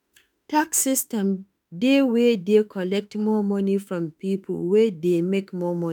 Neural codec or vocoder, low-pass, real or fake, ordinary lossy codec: autoencoder, 48 kHz, 32 numbers a frame, DAC-VAE, trained on Japanese speech; none; fake; none